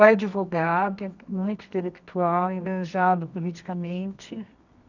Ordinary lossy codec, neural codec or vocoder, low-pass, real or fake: none; codec, 24 kHz, 0.9 kbps, WavTokenizer, medium music audio release; 7.2 kHz; fake